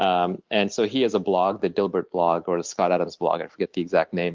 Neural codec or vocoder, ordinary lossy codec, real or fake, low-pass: none; Opus, 24 kbps; real; 7.2 kHz